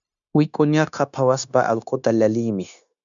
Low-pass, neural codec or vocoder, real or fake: 7.2 kHz; codec, 16 kHz, 0.9 kbps, LongCat-Audio-Codec; fake